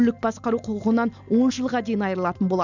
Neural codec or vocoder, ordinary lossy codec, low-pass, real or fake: none; none; 7.2 kHz; real